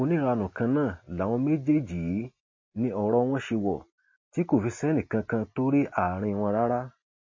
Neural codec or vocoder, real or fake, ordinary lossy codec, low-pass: none; real; MP3, 32 kbps; 7.2 kHz